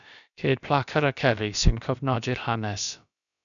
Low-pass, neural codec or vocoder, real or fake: 7.2 kHz; codec, 16 kHz, about 1 kbps, DyCAST, with the encoder's durations; fake